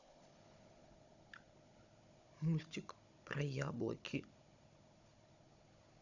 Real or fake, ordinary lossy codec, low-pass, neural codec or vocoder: fake; none; 7.2 kHz; codec, 16 kHz, 16 kbps, FunCodec, trained on Chinese and English, 50 frames a second